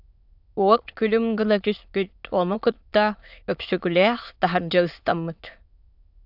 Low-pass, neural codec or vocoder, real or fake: 5.4 kHz; autoencoder, 22.05 kHz, a latent of 192 numbers a frame, VITS, trained on many speakers; fake